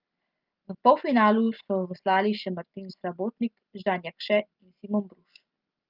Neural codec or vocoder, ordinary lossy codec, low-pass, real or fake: none; Opus, 24 kbps; 5.4 kHz; real